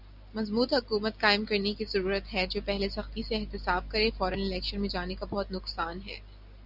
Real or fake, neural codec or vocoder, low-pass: real; none; 5.4 kHz